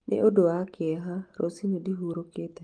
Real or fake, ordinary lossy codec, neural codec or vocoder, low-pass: real; Opus, 24 kbps; none; 9.9 kHz